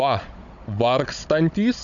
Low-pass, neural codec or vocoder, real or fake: 7.2 kHz; codec, 16 kHz, 16 kbps, FunCodec, trained on Chinese and English, 50 frames a second; fake